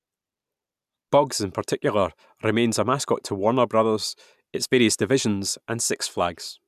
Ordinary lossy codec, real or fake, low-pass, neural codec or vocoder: none; real; 14.4 kHz; none